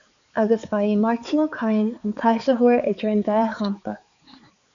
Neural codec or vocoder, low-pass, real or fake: codec, 16 kHz, 4 kbps, X-Codec, HuBERT features, trained on balanced general audio; 7.2 kHz; fake